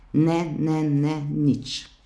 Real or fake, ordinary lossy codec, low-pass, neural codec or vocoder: real; none; none; none